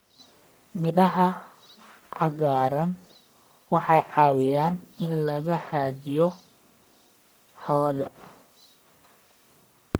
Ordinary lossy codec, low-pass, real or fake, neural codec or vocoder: none; none; fake; codec, 44.1 kHz, 1.7 kbps, Pupu-Codec